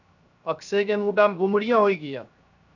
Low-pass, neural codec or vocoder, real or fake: 7.2 kHz; codec, 16 kHz, 0.7 kbps, FocalCodec; fake